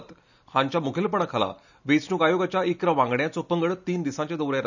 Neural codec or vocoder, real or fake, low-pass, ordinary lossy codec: none; real; 7.2 kHz; none